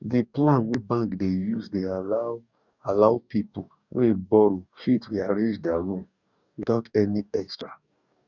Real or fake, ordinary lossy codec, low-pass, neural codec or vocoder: fake; none; 7.2 kHz; codec, 44.1 kHz, 2.6 kbps, DAC